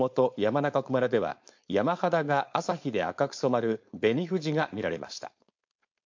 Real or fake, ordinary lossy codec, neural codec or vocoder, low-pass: fake; MP3, 48 kbps; codec, 16 kHz, 4.8 kbps, FACodec; 7.2 kHz